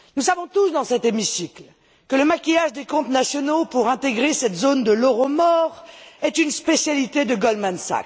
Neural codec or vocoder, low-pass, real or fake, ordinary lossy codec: none; none; real; none